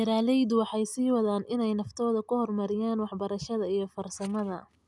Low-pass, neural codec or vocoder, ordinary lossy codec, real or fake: none; none; none; real